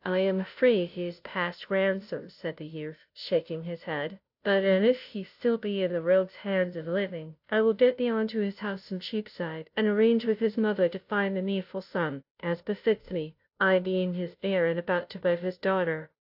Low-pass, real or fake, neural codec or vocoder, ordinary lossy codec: 5.4 kHz; fake; codec, 16 kHz, 0.5 kbps, FunCodec, trained on Chinese and English, 25 frames a second; AAC, 48 kbps